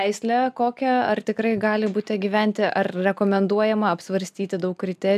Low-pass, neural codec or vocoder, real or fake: 14.4 kHz; none; real